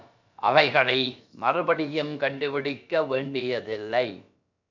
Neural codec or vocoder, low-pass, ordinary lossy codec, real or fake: codec, 16 kHz, about 1 kbps, DyCAST, with the encoder's durations; 7.2 kHz; MP3, 64 kbps; fake